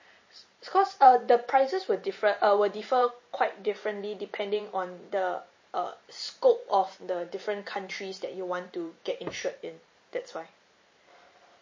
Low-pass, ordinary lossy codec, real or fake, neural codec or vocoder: 7.2 kHz; MP3, 32 kbps; fake; vocoder, 44.1 kHz, 128 mel bands every 512 samples, BigVGAN v2